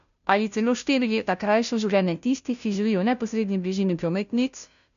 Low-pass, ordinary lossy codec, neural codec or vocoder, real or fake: 7.2 kHz; none; codec, 16 kHz, 0.5 kbps, FunCodec, trained on Chinese and English, 25 frames a second; fake